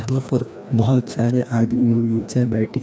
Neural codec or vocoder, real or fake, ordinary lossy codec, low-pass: codec, 16 kHz, 1 kbps, FreqCodec, larger model; fake; none; none